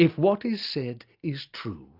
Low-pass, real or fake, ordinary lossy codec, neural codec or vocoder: 5.4 kHz; real; Opus, 64 kbps; none